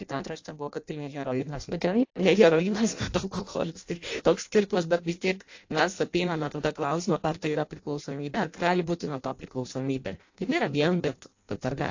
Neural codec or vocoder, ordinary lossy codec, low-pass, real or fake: codec, 16 kHz in and 24 kHz out, 0.6 kbps, FireRedTTS-2 codec; AAC, 48 kbps; 7.2 kHz; fake